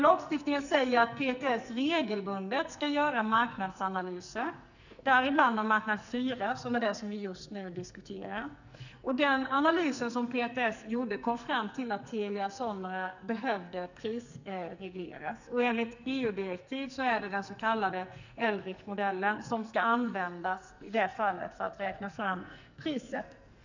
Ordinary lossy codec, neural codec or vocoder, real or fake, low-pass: none; codec, 44.1 kHz, 2.6 kbps, SNAC; fake; 7.2 kHz